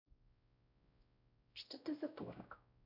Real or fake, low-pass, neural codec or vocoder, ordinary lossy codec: fake; 5.4 kHz; codec, 16 kHz, 0.5 kbps, X-Codec, WavLM features, trained on Multilingual LibriSpeech; none